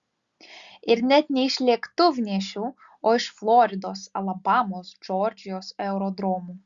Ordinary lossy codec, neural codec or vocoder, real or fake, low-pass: Opus, 64 kbps; none; real; 7.2 kHz